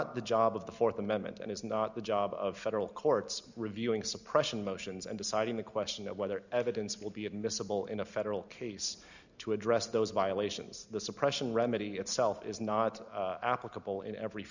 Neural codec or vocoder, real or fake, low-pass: none; real; 7.2 kHz